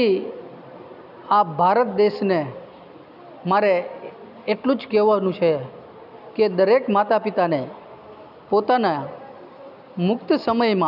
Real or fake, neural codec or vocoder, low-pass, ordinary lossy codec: real; none; 5.4 kHz; none